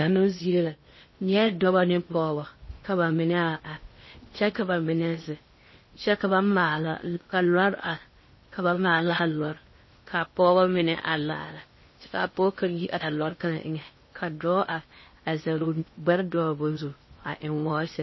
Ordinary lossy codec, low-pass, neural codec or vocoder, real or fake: MP3, 24 kbps; 7.2 kHz; codec, 16 kHz in and 24 kHz out, 0.6 kbps, FocalCodec, streaming, 4096 codes; fake